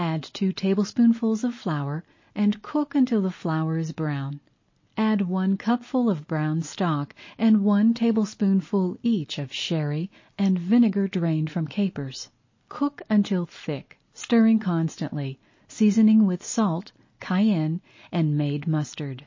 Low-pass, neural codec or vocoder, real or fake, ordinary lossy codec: 7.2 kHz; none; real; MP3, 32 kbps